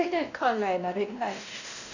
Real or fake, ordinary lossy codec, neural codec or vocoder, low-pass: fake; none; codec, 16 kHz, 1 kbps, X-Codec, WavLM features, trained on Multilingual LibriSpeech; 7.2 kHz